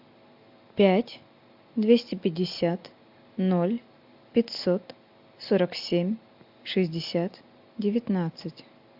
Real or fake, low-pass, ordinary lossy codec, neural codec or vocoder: real; 5.4 kHz; AAC, 48 kbps; none